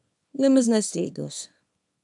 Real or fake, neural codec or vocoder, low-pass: fake; codec, 24 kHz, 0.9 kbps, WavTokenizer, small release; 10.8 kHz